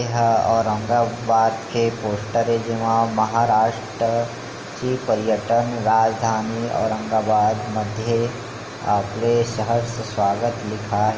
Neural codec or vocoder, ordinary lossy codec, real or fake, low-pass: none; Opus, 24 kbps; real; 7.2 kHz